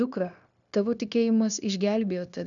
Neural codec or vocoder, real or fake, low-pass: codec, 16 kHz, 0.9 kbps, LongCat-Audio-Codec; fake; 7.2 kHz